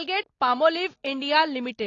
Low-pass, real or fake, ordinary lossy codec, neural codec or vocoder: 7.2 kHz; real; AAC, 32 kbps; none